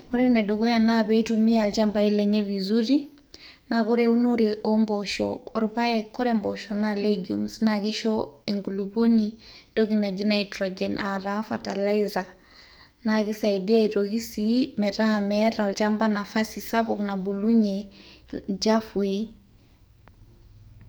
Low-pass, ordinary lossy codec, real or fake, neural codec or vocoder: none; none; fake; codec, 44.1 kHz, 2.6 kbps, SNAC